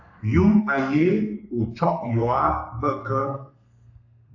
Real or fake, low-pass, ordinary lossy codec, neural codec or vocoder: fake; 7.2 kHz; AAC, 48 kbps; codec, 32 kHz, 1.9 kbps, SNAC